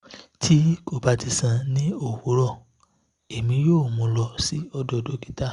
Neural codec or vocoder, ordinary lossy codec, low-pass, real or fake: none; Opus, 64 kbps; 10.8 kHz; real